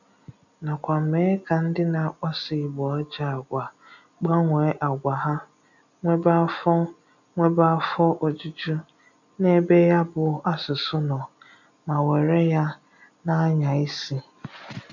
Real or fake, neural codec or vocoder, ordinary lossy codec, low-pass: real; none; none; 7.2 kHz